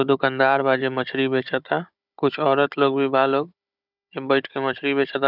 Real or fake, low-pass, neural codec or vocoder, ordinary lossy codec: fake; 5.4 kHz; codec, 16 kHz, 16 kbps, FunCodec, trained on Chinese and English, 50 frames a second; none